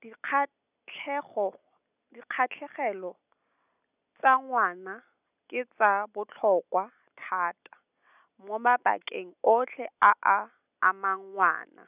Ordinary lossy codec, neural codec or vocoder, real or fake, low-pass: none; none; real; 3.6 kHz